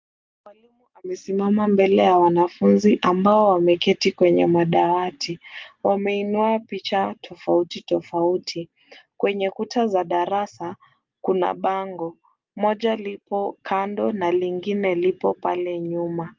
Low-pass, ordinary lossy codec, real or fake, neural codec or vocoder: 7.2 kHz; Opus, 32 kbps; real; none